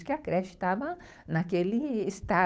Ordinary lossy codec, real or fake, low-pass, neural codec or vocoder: none; fake; none; codec, 16 kHz, 8 kbps, FunCodec, trained on Chinese and English, 25 frames a second